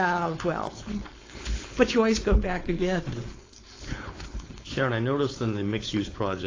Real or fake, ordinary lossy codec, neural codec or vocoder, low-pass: fake; AAC, 32 kbps; codec, 16 kHz, 4.8 kbps, FACodec; 7.2 kHz